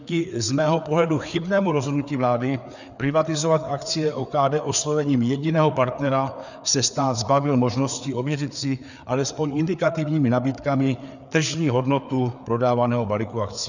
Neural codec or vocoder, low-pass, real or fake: codec, 16 kHz, 4 kbps, FreqCodec, larger model; 7.2 kHz; fake